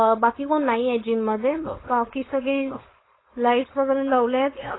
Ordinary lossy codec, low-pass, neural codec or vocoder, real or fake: AAC, 16 kbps; 7.2 kHz; codec, 16 kHz, 4.8 kbps, FACodec; fake